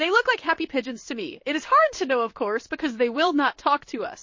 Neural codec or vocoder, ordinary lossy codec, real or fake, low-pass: codec, 16 kHz in and 24 kHz out, 1 kbps, XY-Tokenizer; MP3, 32 kbps; fake; 7.2 kHz